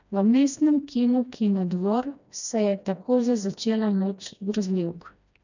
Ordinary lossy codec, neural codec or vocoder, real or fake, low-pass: none; codec, 16 kHz, 1 kbps, FreqCodec, smaller model; fake; 7.2 kHz